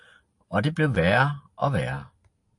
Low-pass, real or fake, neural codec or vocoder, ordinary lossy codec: 10.8 kHz; real; none; AAC, 64 kbps